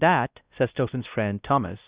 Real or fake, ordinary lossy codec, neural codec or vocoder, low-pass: fake; Opus, 64 kbps; codec, 16 kHz, 1 kbps, X-Codec, WavLM features, trained on Multilingual LibriSpeech; 3.6 kHz